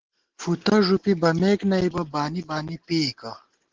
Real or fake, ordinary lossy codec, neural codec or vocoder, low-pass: real; Opus, 16 kbps; none; 7.2 kHz